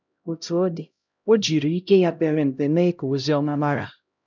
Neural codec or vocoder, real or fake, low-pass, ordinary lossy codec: codec, 16 kHz, 0.5 kbps, X-Codec, HuBERT features, trained on LibriSpeech; fake; 7.2 kHz; none